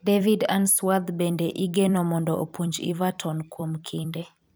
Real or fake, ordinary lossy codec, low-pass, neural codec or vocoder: fake; none; none; vocoder, 44.1 kHz, 128 mel bands every 256 samples, BigVGAN v2